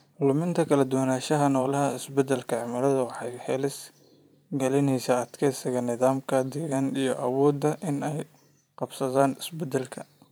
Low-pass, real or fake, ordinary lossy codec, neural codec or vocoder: none; fake; none; vocoder, 44.1 kHz, 128 mel bands every 512 samples, BigVGAN v2